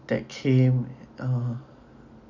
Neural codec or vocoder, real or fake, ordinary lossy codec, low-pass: none; real; none; 7.2 kHz